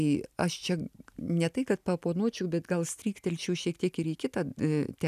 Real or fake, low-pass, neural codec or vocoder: real; 14.4 kHz; none